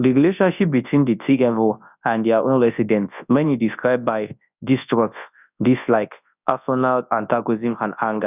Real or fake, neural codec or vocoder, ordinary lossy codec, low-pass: fake; codec, 24 kHz, 0.9 kbps, WavTokenizer, large speech release; none; 3.6 kHz